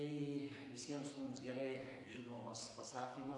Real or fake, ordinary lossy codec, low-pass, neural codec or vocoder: fake; AAC, 48 kbps; 10.8 kHz; codec, 44.1 kHz, 2.6 kbps, SNAC